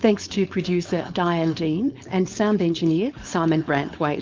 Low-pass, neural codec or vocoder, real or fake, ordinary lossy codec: 7.2 kHz; codec, 16 kHz, 4.8 kbps, FACodec; fake; Opus, 24 kbps